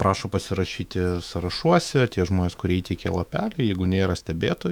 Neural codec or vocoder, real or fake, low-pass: autoencoder, 48 kHz, 128 numbers a frame, DAC-VAE, trained on Japanese speech; fake; 19.8 kHz